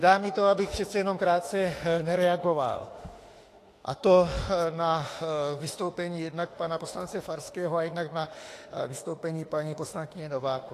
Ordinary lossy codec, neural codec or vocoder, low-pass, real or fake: AAC, 48 kbps; autoencoder, 48 kHz, 32 numbers a frame, DAC-VAE, trained on Japanese speech; 14.4 kHz; fake